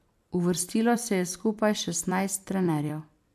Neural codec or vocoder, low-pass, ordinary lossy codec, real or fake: vocoder, 44.1 kHz, 128 mel bands every 256 samples, BigVGAN v2; 14.4 kHz; AAC, 96 kbps; fake